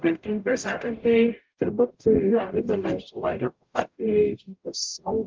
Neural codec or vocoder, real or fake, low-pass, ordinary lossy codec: codec, 44.1 kHz, 0.9 kbps, DAC; fake; 7.2 kHz; Opus, 32 kbps